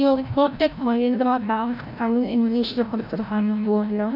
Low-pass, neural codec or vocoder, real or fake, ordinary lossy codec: 5.4 kHz; codec, 16 kHz, 0.5 kbps, FreqCodec, larger model; fake; none